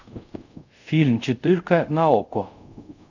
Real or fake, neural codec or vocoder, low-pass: fake; codec, 24 kHz, 0.5 kbps, DualCodec; 7.2 kHz